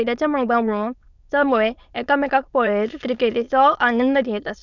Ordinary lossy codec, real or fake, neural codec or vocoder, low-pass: none; fake; autoencoder, 22.05 kHz, a latent of 192 numbers a frame, VITS, trained on many speakers; 7.2 kHz